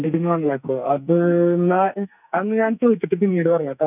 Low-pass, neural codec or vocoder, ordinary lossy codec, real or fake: 3.6 kHz; codec, 44.1 kHz, 2.6 kbps, SNAC; none; fake